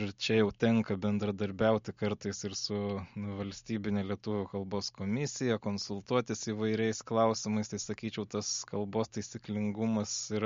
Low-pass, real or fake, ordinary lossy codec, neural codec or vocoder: 7.2 kHz; real; MP3, 48 kbps; none